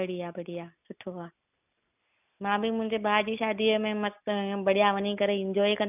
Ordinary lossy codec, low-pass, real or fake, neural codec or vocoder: none; 3.6 kHz; real; none